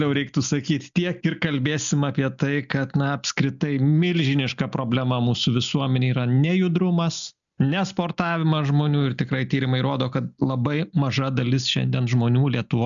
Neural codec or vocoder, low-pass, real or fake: none; 7.2 kHz; real